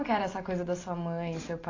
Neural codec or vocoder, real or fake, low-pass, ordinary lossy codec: none; real; 7.2 kHz; AAC, 32 kbps